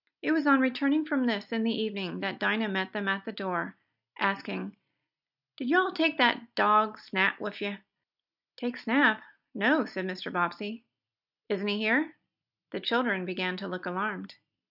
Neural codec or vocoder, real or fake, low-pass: none; real; 5.4 kHz